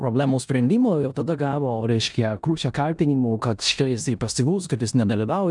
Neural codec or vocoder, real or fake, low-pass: codec, 16 kHz in and 24 kHz out, 0.9 kbps, LongCat-Audio-Codec, four codebook decoder; fake; 10.8 kHz